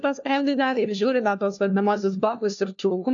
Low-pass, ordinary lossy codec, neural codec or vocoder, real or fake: 7.2 kHz; AAC, 64 kbps; codec, 16 kHz, 1 kbps, FunCodec, trained on LibriTTS, 50 frames a second; fake